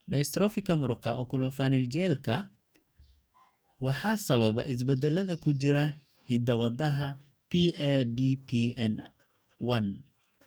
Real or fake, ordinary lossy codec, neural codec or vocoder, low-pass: fake; none; codec, 44.1 kHz, 2.6 kbps, DAC; none